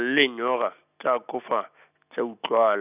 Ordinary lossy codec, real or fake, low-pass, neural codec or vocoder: none; real; 3.6 kHz; none